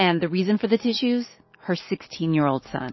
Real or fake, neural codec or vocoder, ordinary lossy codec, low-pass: real; none; MP3, 24 kbps; 7.2 kHz